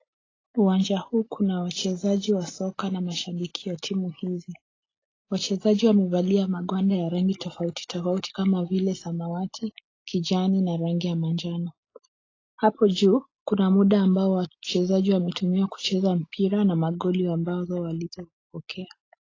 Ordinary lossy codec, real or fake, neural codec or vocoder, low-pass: AAC, 32 kbps; real; none; 7.2 kHz